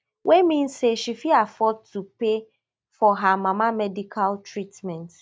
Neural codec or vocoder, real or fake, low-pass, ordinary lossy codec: none; real; none; none